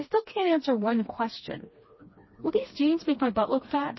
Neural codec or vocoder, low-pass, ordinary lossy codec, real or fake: codec, 16 kHz, 2 kbps, FreqCodec, smaller model; 7.2 kHz; MP3, 24 kbps; fake